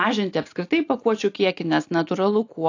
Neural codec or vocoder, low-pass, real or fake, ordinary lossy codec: none; 7.2 kHz; real; AAC, 48 kbps